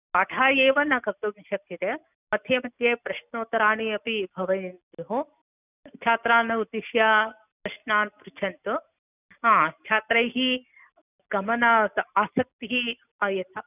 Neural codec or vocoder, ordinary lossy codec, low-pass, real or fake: none; none; 3.6 kHz; real